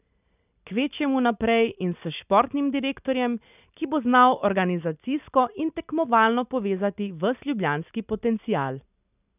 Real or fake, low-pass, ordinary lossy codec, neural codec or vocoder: real; 3.6 kHz; none; none